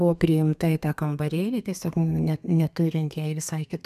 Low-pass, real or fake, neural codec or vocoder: 14.4 kHz; fake; codec, 32 kHz, 1.9 kbps, SNAC